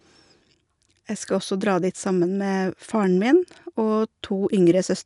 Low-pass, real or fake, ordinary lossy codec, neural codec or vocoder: 10.8 kHz; real; none; none